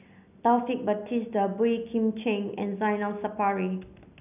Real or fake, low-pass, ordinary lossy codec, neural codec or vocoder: real; 3.6 kHz; none; none